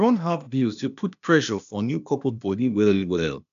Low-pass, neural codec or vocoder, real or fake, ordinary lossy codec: 7.2 kHz; codec, 16 kHz, 0.8 kbps, ZipCodec; fake; none